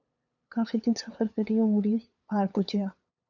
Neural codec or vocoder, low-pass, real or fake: codec, 16 kHz, 8 kbps, FunCodec, trained on LibriTTS, 25 frames a second; 7.2 kHz; fake